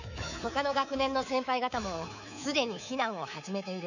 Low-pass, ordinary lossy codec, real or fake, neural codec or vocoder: 7.2 kHz; none; fake; codec, 24 kHz, 3.1 kbps, DualCodec